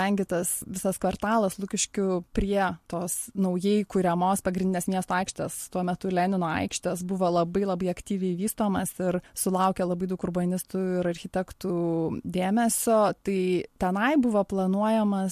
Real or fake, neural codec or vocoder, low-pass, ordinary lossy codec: real; none; 14.4 kHz; MP3, 64 kbps